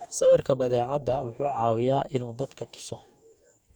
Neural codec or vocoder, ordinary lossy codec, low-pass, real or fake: codec, 44.1 kHz, 2.6 kbps, DAC; none; 19.8 kHz; fake